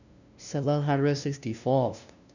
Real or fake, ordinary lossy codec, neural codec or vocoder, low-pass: fake; none; codec, 16 kHz, 0.5 kbps, FunCodec, trained on LibriTTS, 25 frames a second; 7.2 kHz